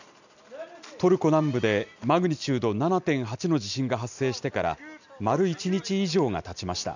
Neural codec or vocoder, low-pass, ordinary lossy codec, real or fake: none; 7.2 kHz; none; real